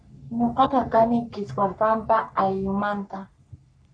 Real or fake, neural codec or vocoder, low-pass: fake; codec, 44.1 kHz, 3.4 kbps, Pupu-Codec; 9.9 kHz